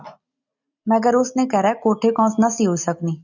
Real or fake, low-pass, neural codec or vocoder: real; 7.2 kHz; none